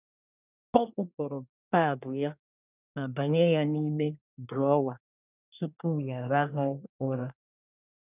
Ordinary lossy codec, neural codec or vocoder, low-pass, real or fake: none; codec, 24 kHz, 1 kbps, SNAC; 3.6 kHz; fake